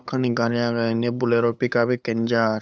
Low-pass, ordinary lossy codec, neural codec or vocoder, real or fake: none; none; codec, 16 kHz, 8 kbps, FunCodec, trained on LibriTTS, 25 frames a second; fake